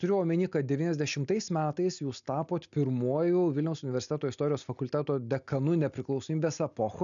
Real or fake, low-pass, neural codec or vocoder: real; 7.2 kHz; none